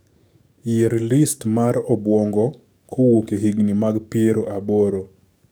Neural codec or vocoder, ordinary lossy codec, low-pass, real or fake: codec, 44.1 kHz, 7.8 kbps, DAC; none; none; fake